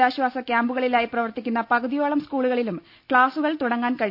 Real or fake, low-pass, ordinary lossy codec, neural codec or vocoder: real; 5.4 kHz; none; none